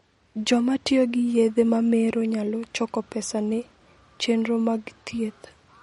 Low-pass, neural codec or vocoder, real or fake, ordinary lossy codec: 19.8 kHz; none; real; MP3, 48 kbps